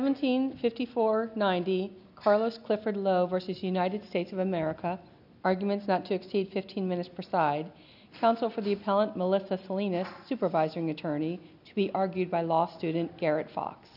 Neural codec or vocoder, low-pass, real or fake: none; 5.4 kHz; real